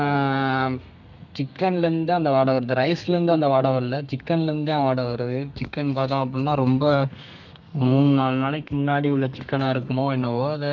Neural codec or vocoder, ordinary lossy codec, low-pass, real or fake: codec, 32 kHz, 1.9 kbps, SNAC; none; 7.2 kHz; fake